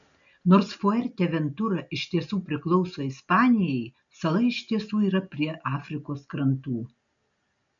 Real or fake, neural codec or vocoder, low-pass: real; none; 7.2 kHz